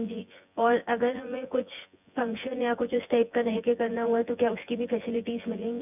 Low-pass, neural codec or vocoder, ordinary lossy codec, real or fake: 3.6 kHz; vocoder, 24 kHz, 100 mel bands, Vocos; none; fake